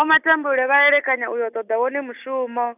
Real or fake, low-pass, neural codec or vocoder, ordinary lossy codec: real; 3.6 kHz; none; none